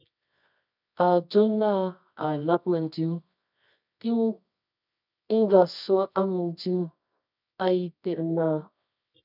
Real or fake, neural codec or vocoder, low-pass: fake; codec, 24 kHz, 0.9 kbps, WavTokenizer, medium music audio release; 5.4 kHz